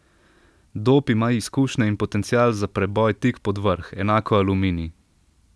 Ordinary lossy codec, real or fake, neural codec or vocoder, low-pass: none; real; none; none